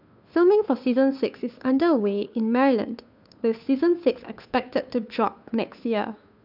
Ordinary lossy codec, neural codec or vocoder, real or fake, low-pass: none; codec, 16 kHz, 2 kbps, FunCodec, trained on Chinese and English, 25 frames a second; fake; 5.4 kHz